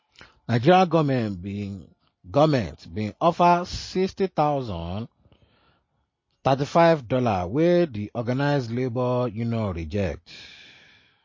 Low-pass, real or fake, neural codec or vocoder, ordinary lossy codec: 7.2 kHz; real; none; MP3, 32 kbps